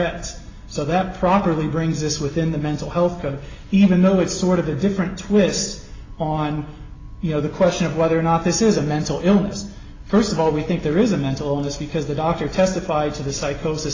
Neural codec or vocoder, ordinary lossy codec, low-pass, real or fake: none; AAC, 32 kbps; 7.2 kHz; real